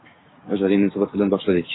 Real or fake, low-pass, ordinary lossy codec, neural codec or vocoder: fake; 7.2 kHz; AAC, 16 kbps; codec, 16 kHz, 6 kbps, DAC